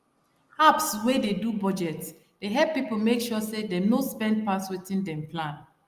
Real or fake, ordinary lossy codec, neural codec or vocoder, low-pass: real; Opus, 32 kbps; none; 14.4 kHz